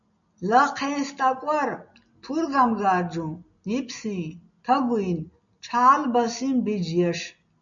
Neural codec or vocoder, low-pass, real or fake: none; 7.2 kHz; real